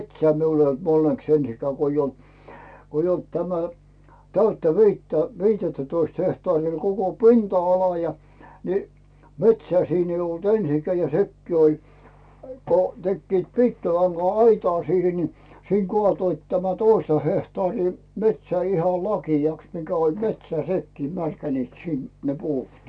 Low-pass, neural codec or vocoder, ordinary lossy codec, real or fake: 9.9 kHz; none; none; real